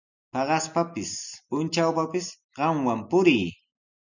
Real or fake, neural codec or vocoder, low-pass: real; none; 7.2 kHz